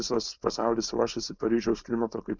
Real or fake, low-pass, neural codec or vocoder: fake; 7.2 kHz; codec, 16 kHz, 4.8 kbps, FACodec